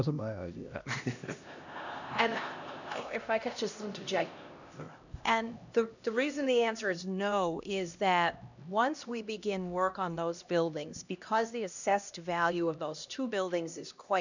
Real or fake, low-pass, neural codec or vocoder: fake; 7.2 kHz; codec, 16 kHz, 1 kbps, X-Codec, HuBERT features, trained on LibriSpeech